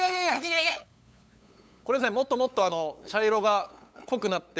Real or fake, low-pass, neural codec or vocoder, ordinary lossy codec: fake; none; codec, 16 kHz, 8 kbps, FunCodec, trained on LibriTTS, 25 frames a second; none